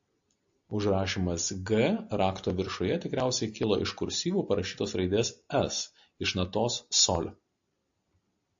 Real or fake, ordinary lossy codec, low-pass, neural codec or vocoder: real; AAC, 64 kbps; 7.2 kHz; none